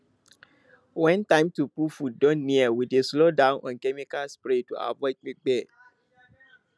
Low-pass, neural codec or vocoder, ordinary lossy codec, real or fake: none; none; none; real